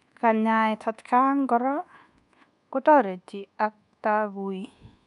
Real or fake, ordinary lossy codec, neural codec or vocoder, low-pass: fake; none; codec, 24 kHz, 1.2 kbps, DualCodec; 10.8 kHz